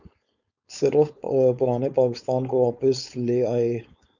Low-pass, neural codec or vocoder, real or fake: 7.2 kHz; codec, 16 kHz, 4.8 kbps, FACodec; fake